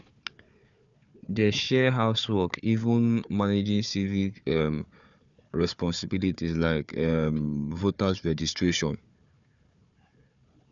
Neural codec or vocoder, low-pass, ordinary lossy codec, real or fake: codec, 16 kHz, 4 kbps, FunCodec, trained on Chinese and English, 50 frames a second; 7.2 kHz; none; fake